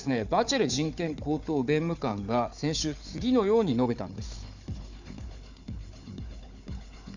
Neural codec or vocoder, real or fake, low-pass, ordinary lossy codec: codec, 16 kHz, 4 kbps, FunCodec, trained on Chinese and English, 50 frames a second; fake; 7.2 kHz; none